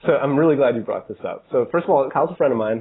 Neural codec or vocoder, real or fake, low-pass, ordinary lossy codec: none; real; 7.2 kHz; AAC, 16 kbps